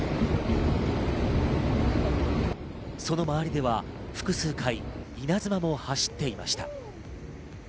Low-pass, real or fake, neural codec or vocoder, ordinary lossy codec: none; real; none; none